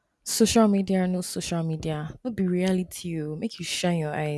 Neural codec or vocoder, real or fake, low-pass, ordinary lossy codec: none; real; none; none